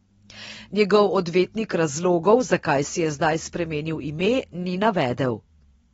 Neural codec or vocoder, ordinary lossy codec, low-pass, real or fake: none; AAC, 24 kbps; 19.8 kHz; real